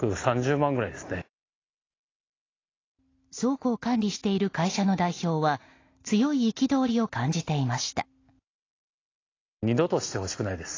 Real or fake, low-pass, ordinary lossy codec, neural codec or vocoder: real; 7.2 kHz; AAC, 32 kbps; none